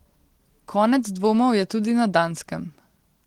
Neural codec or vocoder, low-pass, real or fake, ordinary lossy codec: none; 19.8 kHz; real; Opus, 16 kbps